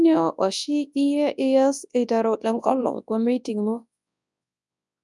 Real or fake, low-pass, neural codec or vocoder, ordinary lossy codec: fake; 10.8 kHz; codec, 24 kHz, 0.9 kbps, WavTokenizer, large speech release; none